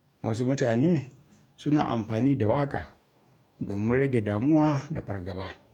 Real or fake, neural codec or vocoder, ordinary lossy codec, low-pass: fake; codec, 44.1 kHz, 2.6 kbps, DAC; none; 19.8 kHz